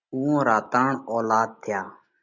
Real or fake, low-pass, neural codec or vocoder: real; 7.2 kHz; none